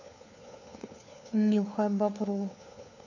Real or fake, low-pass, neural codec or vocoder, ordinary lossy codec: fake; 7.2 kHz; codec, 16 kHz, 4 kbps, FunCodec, trained on LibriTTS, 50 frames a second; none